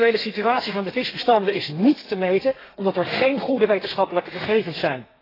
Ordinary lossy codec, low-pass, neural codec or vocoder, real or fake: AAC, 24 kbps; 5.4 kHz; codec, 32 kHz, 1.9 kbps, SNAC; fake